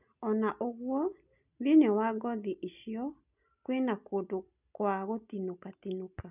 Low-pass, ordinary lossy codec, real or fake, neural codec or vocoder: 3.6 kHz; none; real; none